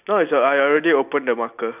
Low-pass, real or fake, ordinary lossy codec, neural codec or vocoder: 3.6 kHz; real; none; none